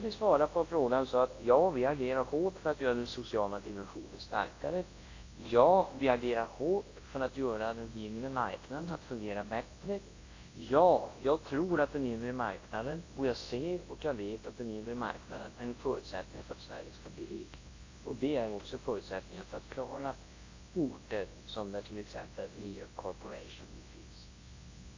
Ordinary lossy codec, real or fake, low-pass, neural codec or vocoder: AAC, 32 kbps; fake; 7.2 kHz; codec, 24 kHz, 0.9 kbps, WavTokenizer, large speech release